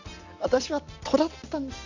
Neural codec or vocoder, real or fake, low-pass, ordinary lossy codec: none; real; 7.2 kHz; Opus, 64 kbps